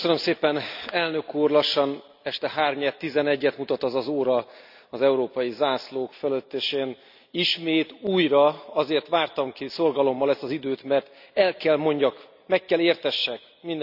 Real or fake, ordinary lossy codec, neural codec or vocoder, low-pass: real; none; none; 5.4 kHz